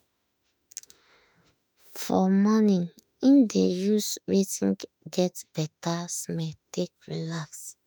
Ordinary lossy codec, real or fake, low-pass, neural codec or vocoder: none; fake; none; autoencoder, 48 kHz, 32 numbers a frame, DAC-VAE, trained on Japanese speech